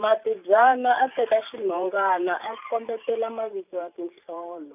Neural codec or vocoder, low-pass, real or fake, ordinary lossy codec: vocoder, 44.1 kHz, 128 mel bands every 512 samples, BigVGAN v2; 3.6 kHz; fake; none